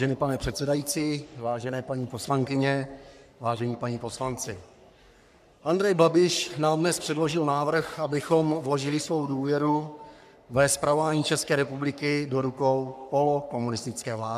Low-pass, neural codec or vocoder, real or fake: 14.4 kHz; codec, 44.1 kHz, 3.4 kbps, Pupu-Codec; fake